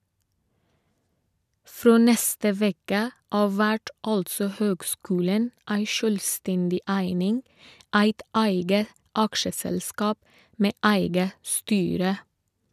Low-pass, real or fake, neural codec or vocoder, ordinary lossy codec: 14.4 kHz; real; none; none